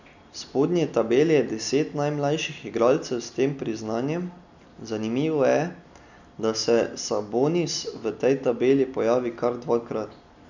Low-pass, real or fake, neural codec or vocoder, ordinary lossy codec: 7.2 kHz; real; none; none